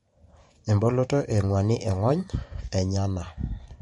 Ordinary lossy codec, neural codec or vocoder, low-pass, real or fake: MP3, 48 kbps; vocoder, 44.1 kHz, 128 mel bands every 512 samples, BigVGAN v2; 19.8 kHz; fake